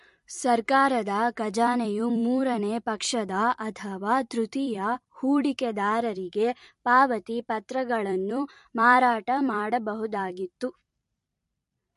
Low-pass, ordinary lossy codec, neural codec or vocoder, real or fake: 14.4 kHz; MP3, 48 kbps; vocoder, 44.1 kHz, 128 mel bands every 256 samples, BigVGAN v2; fake